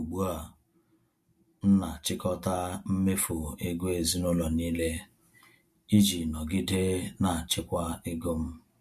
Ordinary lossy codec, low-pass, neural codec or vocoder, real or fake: MP3, 64 kbps; 14.4 kHz; none; real